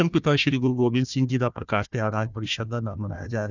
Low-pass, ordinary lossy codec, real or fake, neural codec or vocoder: 7.2 kHz; none; fake; codec, 16 kHz, 1 kbps, FunCodec, trained on Chinese and English, 50 frames a second